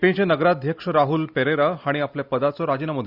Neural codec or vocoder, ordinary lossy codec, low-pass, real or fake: none; Opus, 64 kbps; 5.4 kHz; real